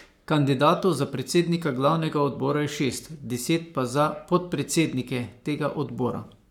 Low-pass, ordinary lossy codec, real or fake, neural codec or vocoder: 19.8 kHz; none; fake; codec, 44.1 kHz, 7.8 kbps, Pupu-Codec